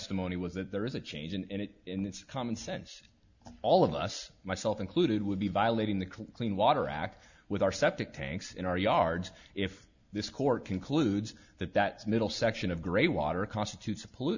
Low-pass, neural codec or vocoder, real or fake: 7.2 kHz; none; real